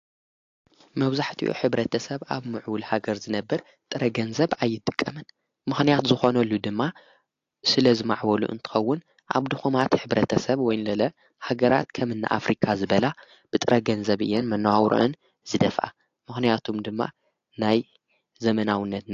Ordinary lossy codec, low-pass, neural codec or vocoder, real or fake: AAC, 48 kbps; 7.2 kHz; none; real